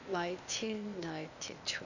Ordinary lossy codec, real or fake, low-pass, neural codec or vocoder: none; fake; 7.2 kHz; codec, 16 kHz, 0.8 kbps, ZipCodec